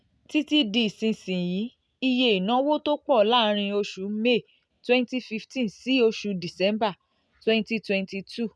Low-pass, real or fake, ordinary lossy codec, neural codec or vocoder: none; real; none; none